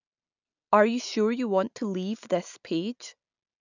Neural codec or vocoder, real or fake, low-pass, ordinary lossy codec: none; real; 7.2 kHz; none